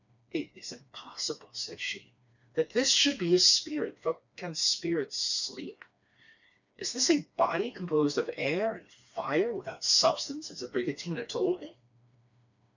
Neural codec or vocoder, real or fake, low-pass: codec, 16 kHz, 2 kbps, FreqCodec, smaller model; fake; 7.2 kHz